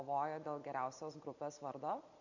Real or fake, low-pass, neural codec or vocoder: real; 7.2 kHz; none